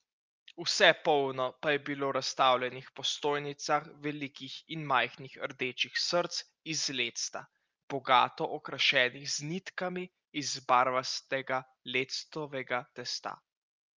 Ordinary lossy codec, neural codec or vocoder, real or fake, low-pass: Opus, 24 kbps; none; real; 7.2 kHz